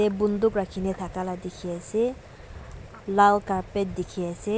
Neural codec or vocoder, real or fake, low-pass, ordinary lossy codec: none; real; none; none